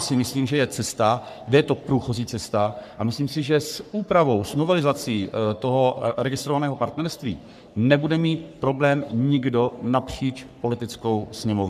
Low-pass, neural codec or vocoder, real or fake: 14.4 kHz; codec, 44.1 kHz, 3.4 kbps, Pupu-Codec; fake